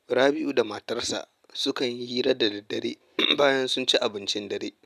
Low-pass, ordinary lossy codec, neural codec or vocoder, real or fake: 14.4 kHz; none; none; real